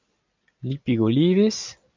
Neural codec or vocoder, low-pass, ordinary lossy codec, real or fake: none; 7.2 kHz; MP3, 64 kbps; real